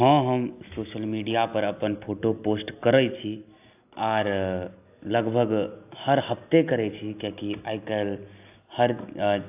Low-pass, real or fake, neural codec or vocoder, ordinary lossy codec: 3.6 kHz; real; none; AAC, 32 kbps